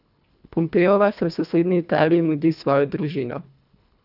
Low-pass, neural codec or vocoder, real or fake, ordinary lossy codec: 5.4 kHz; codec, 24 kHz, 1.5 kbps, HILCodec; fake; none